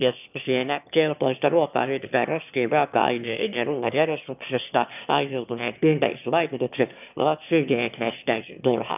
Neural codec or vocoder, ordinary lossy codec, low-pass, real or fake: autoencoder, 22.05 kHz, a latent of 192 numbers a frame, VITS, trained on one speaker; none; 3.6 kHz; fake